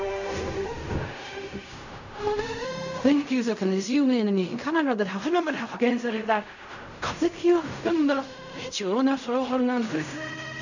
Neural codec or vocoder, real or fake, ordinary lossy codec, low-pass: codec, 16 kHz in and 24 kHz out, 0.4 kbps, LongCat-Audio-Codec, fine tuned four codebook decoder; fake; none; 7.2 kHz